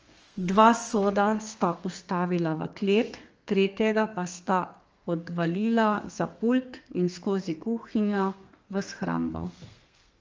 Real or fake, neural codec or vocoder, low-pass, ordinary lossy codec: fake; codec, 32 kHz, 1.9 kbps, SNAC; 7.2 kHz; Opus, 24 kbps